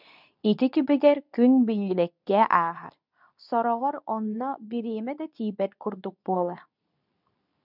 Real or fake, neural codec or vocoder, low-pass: fake; codec, 24 kHz, 0.9 kbps, WavTokenizer, medium speech release version 2; 5.4 kHz